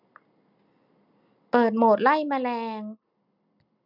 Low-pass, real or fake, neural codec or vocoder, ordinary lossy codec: 5.4 kHz; real; none; none